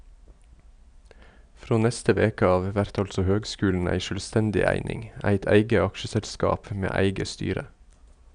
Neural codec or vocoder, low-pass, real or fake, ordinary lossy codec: none; 9.9 kHz; real; none